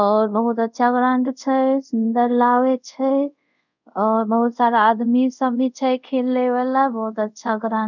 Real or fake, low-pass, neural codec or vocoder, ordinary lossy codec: fake; 7.2 kHz; codec, 24 kHz, 0.5 kbps, DualCodec; none